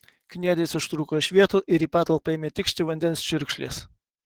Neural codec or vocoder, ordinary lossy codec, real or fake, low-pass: codec, 44.1 kHz, 7.8 kbps, DAC; Opus, 16 kbps; fake; 19.8 kHz